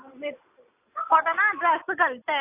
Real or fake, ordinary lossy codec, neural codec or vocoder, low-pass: real; AAC, 24 kbps; none; 3.6 kHz